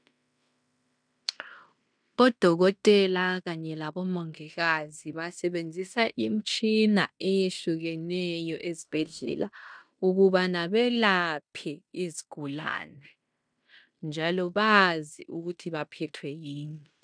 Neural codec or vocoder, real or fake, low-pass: codec, 16 kHz in and 24 kHz out, 0.9 kbps, LongCat-Audio-Codec, fine tuned four codebook decoder; fake; 9.9 kHz